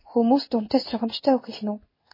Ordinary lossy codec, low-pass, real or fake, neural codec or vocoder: MP3, 24 kbps; 5.4 kHz; fake; codec, 16 kHz in and 24 kHz out, 1 kbps, XY-Tokenizer